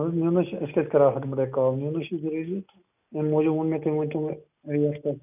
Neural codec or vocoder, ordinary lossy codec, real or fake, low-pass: none; none; real; 3.6 kHz